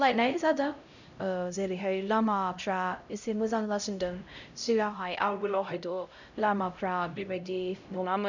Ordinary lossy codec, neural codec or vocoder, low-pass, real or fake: none; codec, 16 kHz, 0.5 kbps, X-Codec, HuBERT features, trained on LibriSpeech; 7.2 kHz; fake